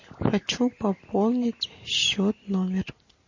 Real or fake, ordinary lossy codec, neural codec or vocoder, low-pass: real; MP3, 32 kbps; none; 7.2 kHz